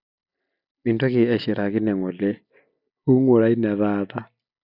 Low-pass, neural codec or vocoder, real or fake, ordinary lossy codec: 5.4 kHz; none; real; none